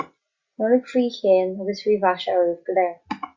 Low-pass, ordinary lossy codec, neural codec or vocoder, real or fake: 7.2 kHz; Opus, 64 kbps; none; real